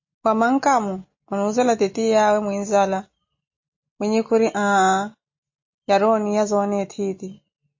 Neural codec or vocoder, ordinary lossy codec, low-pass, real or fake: none; MP3, 32 kbps; 7.2 kHz; real